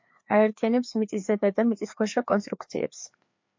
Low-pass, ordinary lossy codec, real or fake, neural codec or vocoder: 7.2 kHz; MP3, 48 kbps; fake; codec, 16 kHz, 2 kbps, FreqCodec, larger model